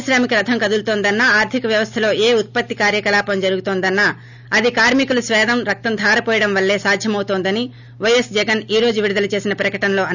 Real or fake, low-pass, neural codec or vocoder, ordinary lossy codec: real; 7.2 kHz; none; none